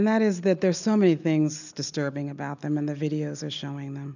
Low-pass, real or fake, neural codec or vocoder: 7.2 kHz; real; none